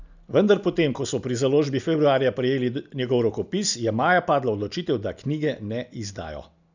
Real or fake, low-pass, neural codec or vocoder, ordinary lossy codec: real; 7.2 kHz; none; none